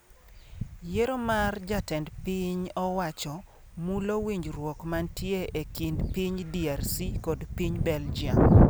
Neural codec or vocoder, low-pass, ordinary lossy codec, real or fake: none; none; none; real